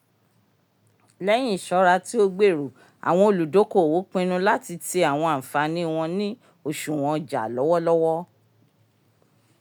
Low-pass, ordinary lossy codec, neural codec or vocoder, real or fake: none; none; none; real